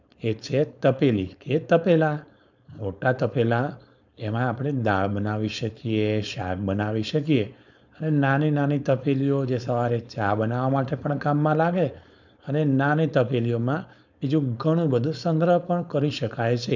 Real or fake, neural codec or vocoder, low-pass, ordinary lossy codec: fake; codec, 16 kHz, 4.8 kbps, FACodec; 7.2 kHz; none